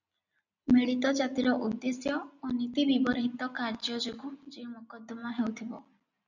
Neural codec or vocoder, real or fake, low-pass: none; real; 7.2 kHz